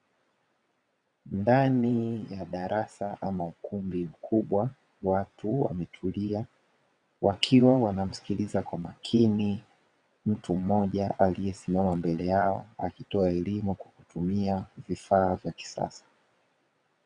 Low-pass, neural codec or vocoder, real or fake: 9.9 kHz; vocoder, 22.05 kHz, 80 mel bands, WaveNeXt; fake